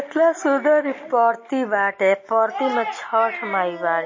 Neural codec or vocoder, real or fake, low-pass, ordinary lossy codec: none; real; 7.2 kHz; MP3, 32 kbps